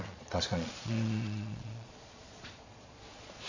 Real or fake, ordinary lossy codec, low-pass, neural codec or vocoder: fake; AAC, 48 kbps; 7.2 kHz; vocoder, 22.05 kHz, 80 mel bands, Vocos